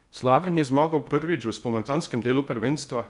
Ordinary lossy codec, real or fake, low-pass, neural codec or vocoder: none; fake; 10.8 kHz; codec, 16 kHz in and 24 kHz out, 0.8 kbps, FocalCodec, streaming, 65536 codes